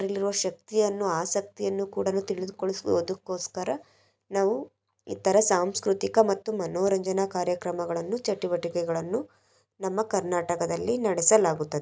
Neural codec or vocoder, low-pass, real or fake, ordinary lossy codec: none; none; real; none